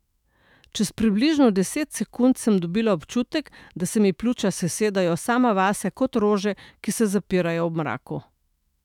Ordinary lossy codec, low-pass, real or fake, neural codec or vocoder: none; 19.8 kHz; fake; autoencoder, 48 kHz, 128 numbers a frame, DAC-VAE, trained on Japanese speech